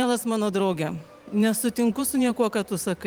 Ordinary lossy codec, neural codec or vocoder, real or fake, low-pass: Opus, 24 kbps; vocoder, 44.1 kHz, 128 mel bands every 512 samples, BigVGAN v2; fake; 19.8 kHz